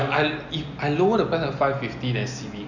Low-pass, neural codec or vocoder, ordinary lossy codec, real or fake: 7.2 kHz; none; none; real